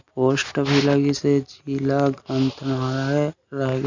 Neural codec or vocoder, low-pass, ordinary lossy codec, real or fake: none; 7.2 kHz; none; real